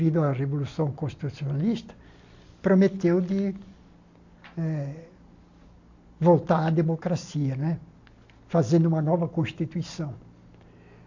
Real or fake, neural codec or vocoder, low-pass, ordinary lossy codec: real; none; 7.2 kHz; AAC, 48 kbps